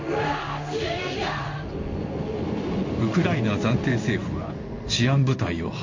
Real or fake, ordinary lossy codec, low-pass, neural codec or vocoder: fake; MP3, 48 kbps; 7.2 kHz; codec, 16 kHz in and 24 kHz out, 1 kbps, XY-Tokenizer